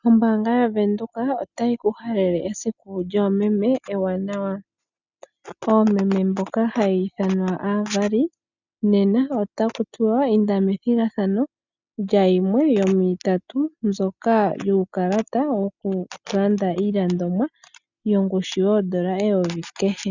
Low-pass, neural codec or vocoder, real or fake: 7.2 kHz; none; real